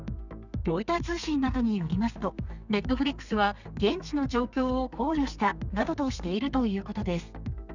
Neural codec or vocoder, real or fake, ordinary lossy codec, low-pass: codec, 32 kHz, 1.9 kbps, SNAC; fake; none; 7.2 kHz